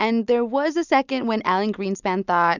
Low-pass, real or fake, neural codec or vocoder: 7.2 kHz; real; none